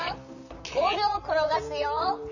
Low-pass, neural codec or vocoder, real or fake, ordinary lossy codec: 7.2 kHz; vocoder, 44.1 kHz, 128 mel bands, Pupu-Vocoder; fake; none